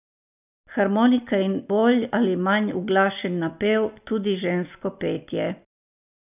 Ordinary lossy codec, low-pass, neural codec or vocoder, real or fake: none; 3.6 kHz; none; real